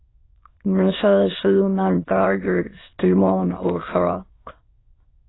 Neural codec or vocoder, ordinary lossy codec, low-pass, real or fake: autoencoder, 22.05 kHz, a latent of 192 numbers a frame, VITS, trained on many speakers; AAC, 16 kbps; 7.2 kHz; fake